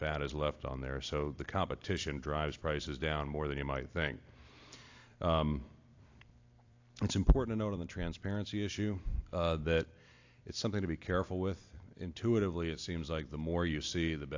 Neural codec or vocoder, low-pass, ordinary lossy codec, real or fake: vocoder, 44.1 kHz, 128 mel bands every 256 samples, BigVGAN v2; 7.2 kHz; AAC, 48 kbps; fake